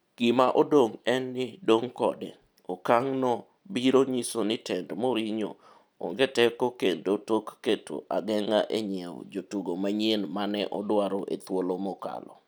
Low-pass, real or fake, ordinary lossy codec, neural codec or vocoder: none; real; none; none